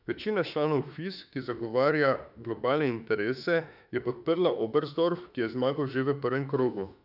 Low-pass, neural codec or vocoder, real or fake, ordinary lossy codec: 5.4 kHz; autoencoder, 48 kHz, 32 numbers a frame, DAC-VAE, trained on Japanese speech; fake; none